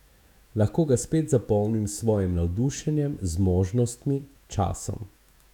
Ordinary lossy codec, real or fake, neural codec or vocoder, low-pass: none; fake; autoencoder, 48 kHz, 128 numbers a frame, DAC-VAE, trained on Japanese speech; 19.8 kHz